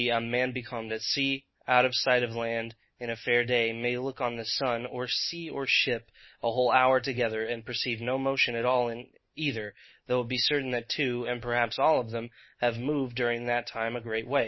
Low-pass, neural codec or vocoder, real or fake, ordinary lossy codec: 7.2 kHz; none; real; MP3, 24 kbps